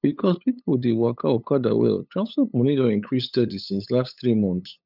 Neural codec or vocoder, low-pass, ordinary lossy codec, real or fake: codec, 16 kHz, 8 kbps, FunCodec, trained on LibriTTS, 25 frames a second; 5.4 kHz; none; fake